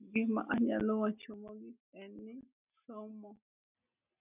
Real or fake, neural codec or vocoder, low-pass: real; none; 3.6 kHz